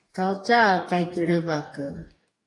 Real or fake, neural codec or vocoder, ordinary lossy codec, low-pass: fake; codec, 44.1 kHz, 2.6 kbps, DAC; AAC, 48 kbps; 10.8 kHz